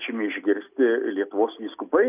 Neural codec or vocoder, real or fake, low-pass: none; real; 3.6 kHz